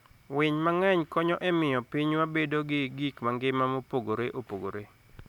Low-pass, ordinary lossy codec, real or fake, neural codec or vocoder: 19.8 kHz; none; real; none